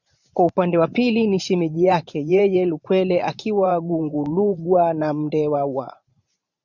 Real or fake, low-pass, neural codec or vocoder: fake; 7.2 kHz; vocoder, 44.1 kHz, 128 mel bands every 512 samples, BigVGAN v2